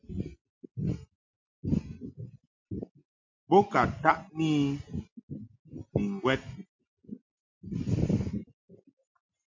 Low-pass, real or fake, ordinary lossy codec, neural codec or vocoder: 7.2 kHz; real; MP3, 48 kbps; none